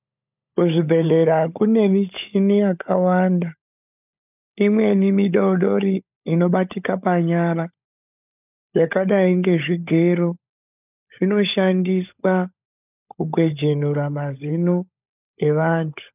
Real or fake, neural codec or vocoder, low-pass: fake; codec, 16 kHz, 16 kbps, FunCodec, trained on LibriTTS, 50 frames a second; 3.6 kHz